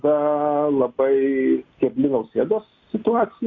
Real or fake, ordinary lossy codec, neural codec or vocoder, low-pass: real; AAC, 48 kbps; none; 7.2 kHz